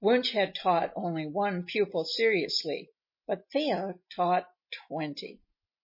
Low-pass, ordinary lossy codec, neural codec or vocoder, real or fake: 5.4 kHz; MP3, 24 kbps; none; real